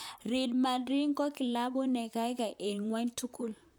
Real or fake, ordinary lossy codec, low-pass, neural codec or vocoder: fake; none; none; vocoder, 44.1 kHz, 128 mel bands, Pupu-Vocoder